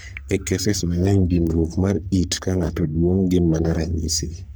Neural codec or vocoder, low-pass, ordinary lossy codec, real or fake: codec, 44.1 kHz, 3.4 kbps, Pupu-Codec; none; none; fake